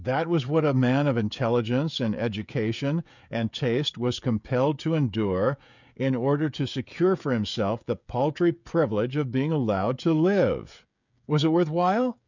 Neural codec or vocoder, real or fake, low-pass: codec, 16 kHz, 16 kbps, FreqCodec, smaller model; fake; 7.2 kHz